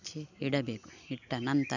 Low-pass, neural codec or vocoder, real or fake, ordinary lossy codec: 7.2 kHz; none; real; none